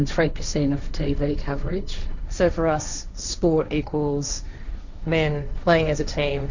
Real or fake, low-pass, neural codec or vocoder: fake; 7.2 kHz; codec, 16 kHz, 1.1 kbps, Voila-Tokenizer